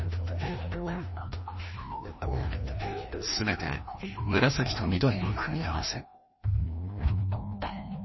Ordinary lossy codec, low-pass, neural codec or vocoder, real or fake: MP3, 24 kbps; 7.2 kHz; codec, 16 kHz, 1 kbps, FreqCodec, larger model; fake